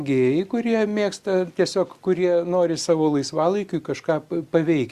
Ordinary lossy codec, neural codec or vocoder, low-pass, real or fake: Opus, 64 kbps; none; 14.4 kHz; real